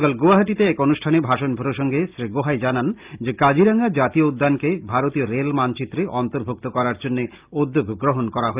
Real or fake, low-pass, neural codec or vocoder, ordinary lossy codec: real; 3.6 kHz; none; Opus, 32 kbps